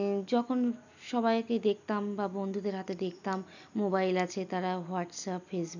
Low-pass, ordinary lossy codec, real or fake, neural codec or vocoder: 7.2 kHz; none; real; none